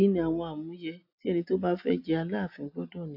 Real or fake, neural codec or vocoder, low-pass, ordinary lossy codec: real; none; 5.4 kHz; AAC, 48 kbps